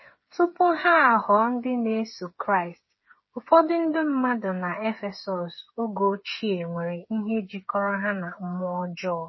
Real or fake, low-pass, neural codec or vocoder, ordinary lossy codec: fake; 7.2 kHz; codec, 16 kHz, 8 kbps, FreqCodec, smaller model; MP3, 24 kbps